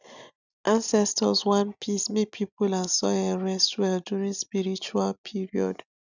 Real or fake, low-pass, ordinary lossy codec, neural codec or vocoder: real; 7.2 kHz; none; none